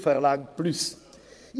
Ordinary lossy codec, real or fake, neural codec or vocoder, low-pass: none; fake; vocoder, 22.05 kHz, 80 mel bands, Vocos; none